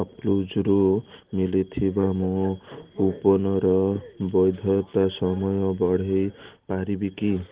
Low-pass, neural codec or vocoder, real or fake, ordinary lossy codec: 3.6 kHz; none; real; Opus, 16 kbps